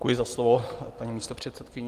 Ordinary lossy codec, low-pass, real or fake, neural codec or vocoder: Opus, 24 kbps; 14.4 kHz; fake; vocoder, 44.1 kHz, 128 mel bands every 256 samples, BigVGAN v2